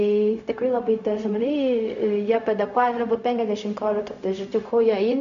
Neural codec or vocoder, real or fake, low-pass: codec, 16 kHz, 0.4 kbps, LongCat-Audio-Codec; fake; 7.2 kHz